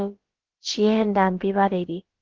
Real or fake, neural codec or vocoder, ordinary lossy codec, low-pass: fake; codec, 16 kHz, about 1 kbps, DyCAST, with the encoder's durations; Opus, 16 kbps; 7.2 kHz